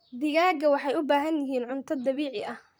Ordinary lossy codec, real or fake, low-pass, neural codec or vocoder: none; fake; none; vocoder, 44.1 kHz, 128 mel bands, Pupu-Vocoder